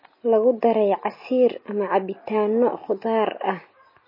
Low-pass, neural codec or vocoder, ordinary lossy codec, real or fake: 5.4 kHz; none; MP3, 24 kbps; real